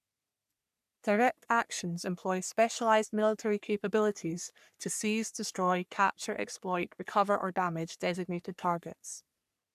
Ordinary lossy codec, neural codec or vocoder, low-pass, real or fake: none; codec, 44.1 kHz, 3.4 kbps, Pupu-Codec; 14.4 kHz; fake